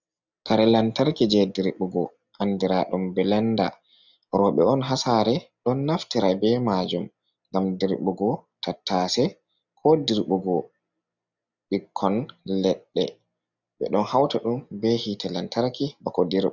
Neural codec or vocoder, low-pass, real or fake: none; 7.2 kHz; real